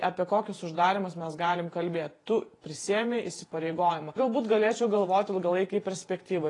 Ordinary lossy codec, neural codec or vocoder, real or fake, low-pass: AAC, 32 kbps; vocoder, 48 kHz, 128 mel bands, Vocos; fake; 10.8 kHz